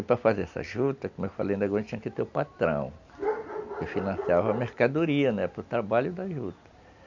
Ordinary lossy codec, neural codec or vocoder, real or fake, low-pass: none; none; real; 7.2 kHz